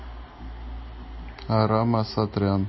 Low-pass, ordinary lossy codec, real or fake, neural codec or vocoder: 7.2 kHz; MP3, 24 kbps; real; none